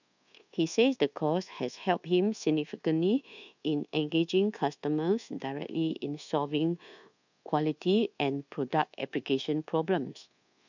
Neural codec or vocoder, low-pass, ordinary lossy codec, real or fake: codec, 24 kHz, 1.2 kbps, DualCodec; 7.2 kHz; none; fake